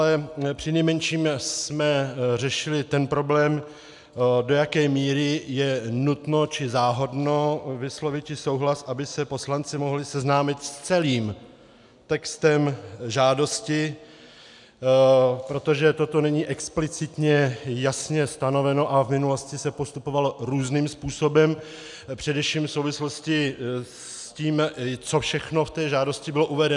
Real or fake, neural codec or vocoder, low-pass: real; none; 10.8 kHz